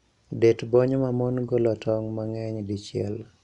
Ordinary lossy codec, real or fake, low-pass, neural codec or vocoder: none; real; 10.8 kHz; none